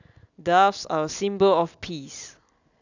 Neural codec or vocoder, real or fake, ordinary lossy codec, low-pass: none; real; none; 7.2 kHz